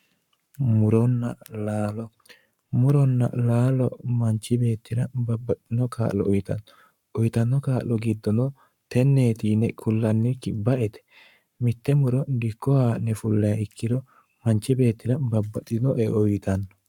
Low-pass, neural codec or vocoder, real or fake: 19.8 kHz; codec, 44.1 kHz, 7.8 kbps, Pupu-Codec; fake